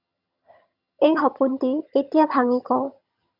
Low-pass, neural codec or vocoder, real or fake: 5.4 kHz; vocoder, 22.05 kHz, 80 mel bands, HiFi-GAN; fake